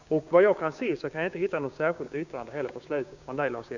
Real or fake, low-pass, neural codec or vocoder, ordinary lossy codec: fake; 7.2 kHz; codec, 16 kHz, 6 kbps, DAC; none